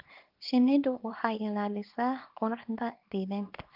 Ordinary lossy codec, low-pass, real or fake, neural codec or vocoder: Opus, 24 kbps; 5.4 kHz; fake; codec, 24 kHz, 0.9 kbps, WavTokenizer, small release